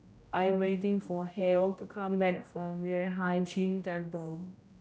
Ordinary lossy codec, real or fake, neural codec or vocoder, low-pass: none; fake; codec, 16 kHz, 0.5 kbps, X-Codec, HuBERT features, trained on general audio; none